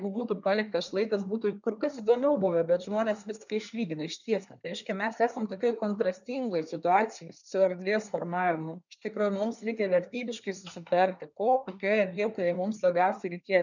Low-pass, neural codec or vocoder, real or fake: 7.2 kHz; codec, 24 kHz, 1 kbps, SNAC; fake